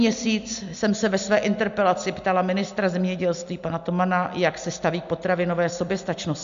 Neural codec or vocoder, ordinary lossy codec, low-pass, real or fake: none; MP3, 64 kbps; 7.2 kHz; real